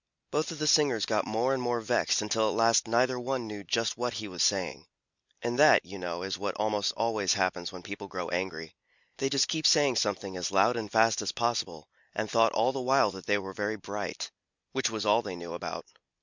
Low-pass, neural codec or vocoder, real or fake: 7.2 kHz; none; real